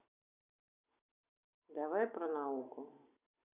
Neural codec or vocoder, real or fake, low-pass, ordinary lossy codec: none; real; 3.6 kHz; none